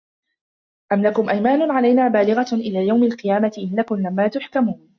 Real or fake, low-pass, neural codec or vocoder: real; 7.2 kHz; none